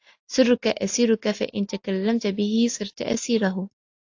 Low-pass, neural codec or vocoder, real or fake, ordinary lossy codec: 7.2 kHz; none; real; AAC, 48 kbps